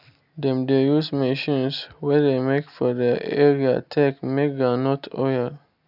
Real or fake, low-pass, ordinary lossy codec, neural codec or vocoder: real; 5.4 kHz; none; none